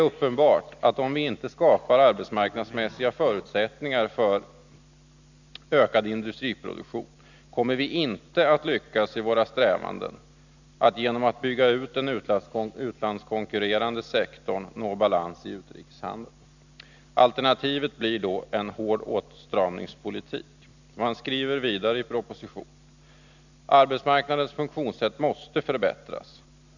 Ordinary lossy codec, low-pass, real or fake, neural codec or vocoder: none; 7.2 kHz; real; none